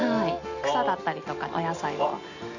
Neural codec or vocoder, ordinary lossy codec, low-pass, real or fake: none; none; 7.2 kHz; real